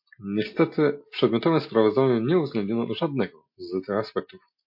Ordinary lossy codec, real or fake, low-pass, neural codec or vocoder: MP3, 32 kbps; real; 5.4 kHz; none